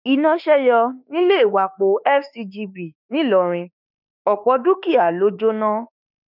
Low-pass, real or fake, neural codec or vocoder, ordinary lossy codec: 5.4 kHz; fake; autoencoder, 48 kHz, 32 numbers a frame, DAC-VAE, trained on Japanese speech; none